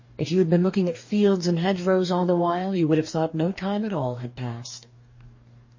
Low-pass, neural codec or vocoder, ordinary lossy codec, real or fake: 7.2 kHz; codec, 44.1 kHz, 2.6 kbps, DAC; MP3, 32 kbps; fake